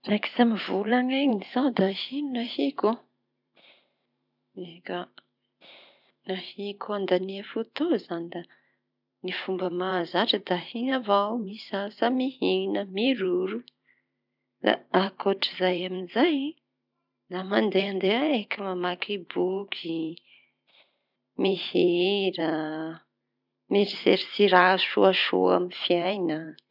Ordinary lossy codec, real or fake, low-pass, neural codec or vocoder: none; fake; 5.4 kHz; vocoder, 44.1 kHz, 128 mel bands every 512 samples, BigVGAN v2